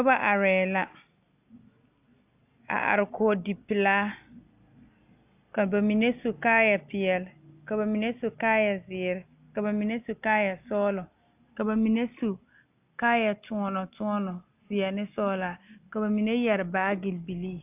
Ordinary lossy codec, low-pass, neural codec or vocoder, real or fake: AAC, 32 kbps; 3.6 kHz; none; real